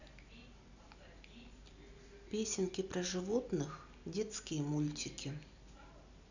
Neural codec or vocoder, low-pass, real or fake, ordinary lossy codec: none; 7.2 kHz; real; none